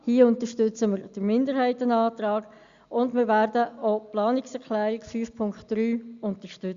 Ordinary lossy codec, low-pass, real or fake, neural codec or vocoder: Opus, 64 kbps; 7.2 kHz; real; none